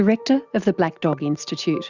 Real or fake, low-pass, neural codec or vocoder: real; 7.2 kHz; none